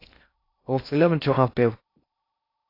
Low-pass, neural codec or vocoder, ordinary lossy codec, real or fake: 5.4 kHz; codec, 16 kHz in and 24 kHz out, 0.6 kbps, FocalCodec, streaming, 2048 codes; AAC, 32 kbps; fake